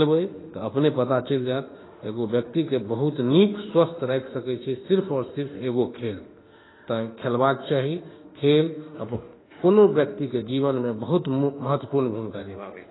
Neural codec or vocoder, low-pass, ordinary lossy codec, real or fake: autoencoder, 48 kHz, 32 numbers a frame, DAC-VAE, trained on Japanese speech; 7.2 kHz; AAC, 16 kbps; fake